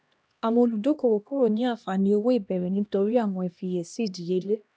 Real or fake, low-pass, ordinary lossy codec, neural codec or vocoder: fake; none; none; codec, 16 kHz, 1 kbps, X-Codec, HuBERT features, trained on LibriSpeech